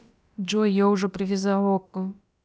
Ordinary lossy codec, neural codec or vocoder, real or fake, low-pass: none; codec, 16 kHz, about 1 kbps, DyCAST, with the encoder's durations; fake; none